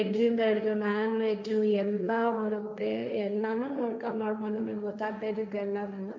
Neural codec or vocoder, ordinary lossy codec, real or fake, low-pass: codec, 16 kHz, 1.1 kbps, Voila-Tokenizer; none; fake; none